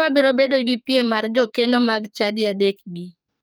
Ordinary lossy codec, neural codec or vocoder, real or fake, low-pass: none; codec, 44.1 kHz, 2.6 kbps, SNAC; fake; none